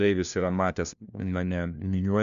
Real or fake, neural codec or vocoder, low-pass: fake; codec, 16 kHz, 1 kbps, FunCodec, trained on LibriTTS, 50 frames a second; 7.2 kHz